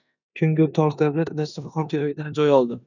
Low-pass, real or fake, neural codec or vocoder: 7.2 kHz; fake; codec, 16 kHz in and 24 kHz out, 0.9 kbps, LongCat-Audio-Codec, four codebook decoder